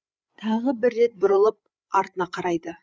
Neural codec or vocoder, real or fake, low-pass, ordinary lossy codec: codec, 16 kHz, 8 kbps, FreqCodec, larger model; fake; none; none